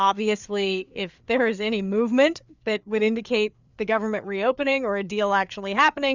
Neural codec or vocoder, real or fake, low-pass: codec, 16 kHz, 4 kbps, FreqCodec, larger model; fake; 7.2 kHz